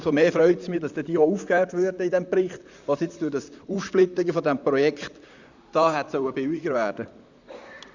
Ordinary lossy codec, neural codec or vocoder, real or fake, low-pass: Opus, 64 kbps; vocoder, 44.1 kHz, 128 mel bands, Pupu-Vocoder; fake; 7.2 kHz